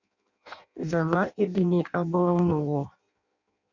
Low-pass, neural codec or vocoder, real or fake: 7.2 kHz; codec, 16 kHz in and 24 kHz out, 0.6 kbps, FireRedTTS-2 codec; fake